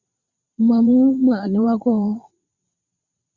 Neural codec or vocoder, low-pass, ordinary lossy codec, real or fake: vocoder, 22.05 kHz, 80 mel bands, WaveNeXt; 7.2 kHz; Opus, 64 kbps; fake